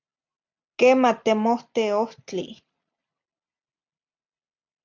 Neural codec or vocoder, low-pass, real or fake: none; 7.2 kHz; real